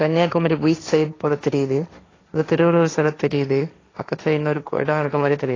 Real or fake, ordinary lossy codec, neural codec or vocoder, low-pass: fake; AAC, 32 kbps; codec, 16 kHz, 1.1 kbps, Voila-Tokenizer; 7.2 kHz